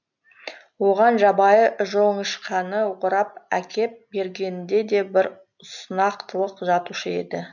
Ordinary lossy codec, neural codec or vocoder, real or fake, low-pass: none; none; real; 7.2 kHz